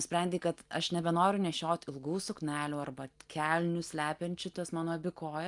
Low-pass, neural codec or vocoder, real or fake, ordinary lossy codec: 10.8 kHz; none; real; Opus, 32 kbps